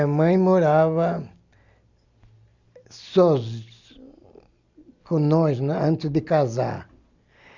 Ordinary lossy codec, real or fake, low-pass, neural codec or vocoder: Opus, 64 kbps; real; 7.2 kHz; none